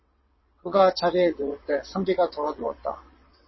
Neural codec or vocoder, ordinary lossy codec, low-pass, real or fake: vocoder, 22.05 kHz, 80 mel bands, WaveNeXt; MP3, 24 kbps; 7.2 kHz; fake